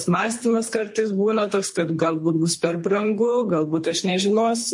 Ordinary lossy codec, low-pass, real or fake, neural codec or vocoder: MP3, 48 kbps; 10.8 kHz; fake; codec, 24 kHz, 3 kbps, HILCodec